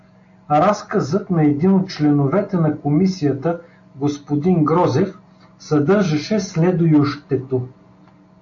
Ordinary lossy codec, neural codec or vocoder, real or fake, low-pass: AAC, 48 kbps; none; real; 7.2 kHz